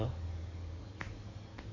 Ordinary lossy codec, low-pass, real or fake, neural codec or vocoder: none; 7.2 kHz; fake; codec, 44.1 kHz, 7.8 kbps, DAC